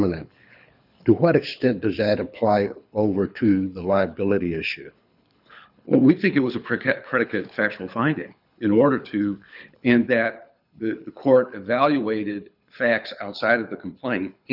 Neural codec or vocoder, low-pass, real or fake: codec, 24 kHz, 6 kbps, HILCodec; 5.4 kHz; fake